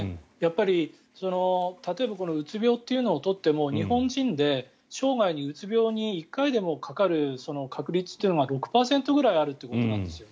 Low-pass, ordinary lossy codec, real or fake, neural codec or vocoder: none; none; real; none